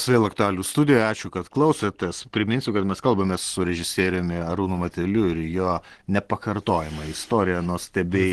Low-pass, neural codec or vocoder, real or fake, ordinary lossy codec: 10.8 kHz; none; real; Opus, 16 kbps